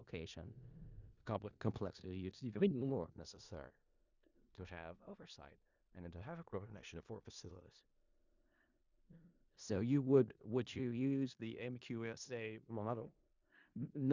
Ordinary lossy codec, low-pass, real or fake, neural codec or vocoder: Opus, 64 kbps; 7.2 kHz; fake; codec, 16 kHz in and 24 kHz out, 0.4 kbps, LongCat-Audio-Codec, four codebook decoder